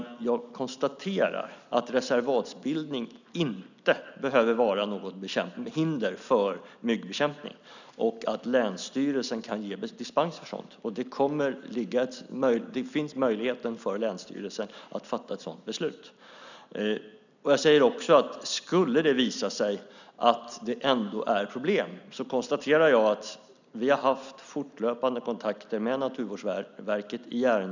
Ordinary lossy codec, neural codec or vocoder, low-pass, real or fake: none; none; 7.2 kHz; real